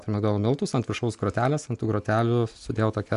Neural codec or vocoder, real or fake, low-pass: none; real; 10.8 kHz